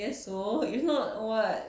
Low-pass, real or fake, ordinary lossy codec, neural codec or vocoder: none; real; none; none